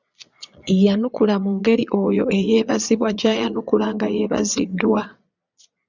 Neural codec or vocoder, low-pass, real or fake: vocoder, 22.05 kHz, 80 mel bands, Vocos; 7.2 kHz; fake